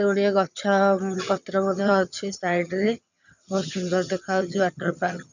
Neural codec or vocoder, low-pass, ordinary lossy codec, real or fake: vocoder, 22.05 kHz, 80 mel bands, HiFi-GAN; 7.2 kHz; none; fake